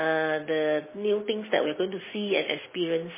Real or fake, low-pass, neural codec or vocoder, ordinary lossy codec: real; 3.6 kHz; none; MP3, 16 kbps